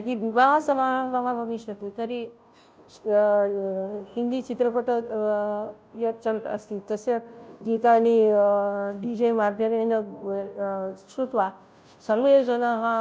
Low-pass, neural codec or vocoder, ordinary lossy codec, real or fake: none; codec, 16 kHz, 0.5 kbps, FunCodec, trained on Chinese and English, 25 frames a second; none; fake